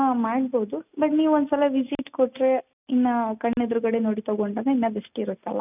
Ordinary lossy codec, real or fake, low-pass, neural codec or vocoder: none; real; 3.6 kHz; none